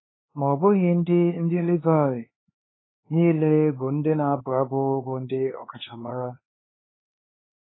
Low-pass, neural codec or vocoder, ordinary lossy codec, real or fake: 7.2 kHz; codec, 16 kHz, 4 kbps, X-Codec, HuBERT features, trained on LibriSpeech; AAC, 16 kbps; fake